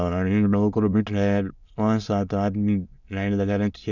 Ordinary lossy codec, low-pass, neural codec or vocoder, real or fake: none; 7.2 kHz; autoencoder, 22.05 kHz, a latent of 192 numbers a frame, VITS, trained on many speakers; fake